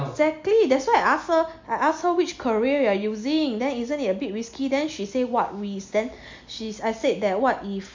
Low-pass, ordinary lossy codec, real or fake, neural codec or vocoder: 7.2 kHz; MP3, 48 kbps; real; none